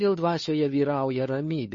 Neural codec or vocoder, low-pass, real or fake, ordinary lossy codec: codec, 16 kHz, 2 kbps, FunCodec, trained on Chinese and English, 25 frames a second; 7.2 kHz; fake; MP3, 32 kbps